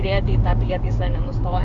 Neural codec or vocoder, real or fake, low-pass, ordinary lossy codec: codec, 16 kHz, 6 kbps, DAC; fake; 7.2 kHz; AAC, 64 kbps